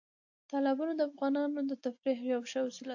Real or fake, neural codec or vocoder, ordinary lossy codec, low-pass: real; none; AAC, 48 kbps; 7.2 kHz